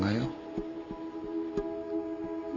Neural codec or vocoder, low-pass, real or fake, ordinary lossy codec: none; 7.2 kHz; real; none